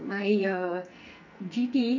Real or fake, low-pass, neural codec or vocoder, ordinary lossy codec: fake; 7.2 kHz; codec, 44.1 kHz, 2.6 kbps, SNAC; none